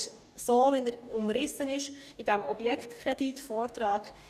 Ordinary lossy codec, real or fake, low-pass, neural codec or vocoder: none; fake; 14.4 kHz; codec, 44.1 kHz, 2.6 kbps, DAC